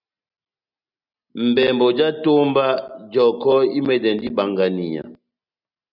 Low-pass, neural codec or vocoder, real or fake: 5.4 kHz; none; real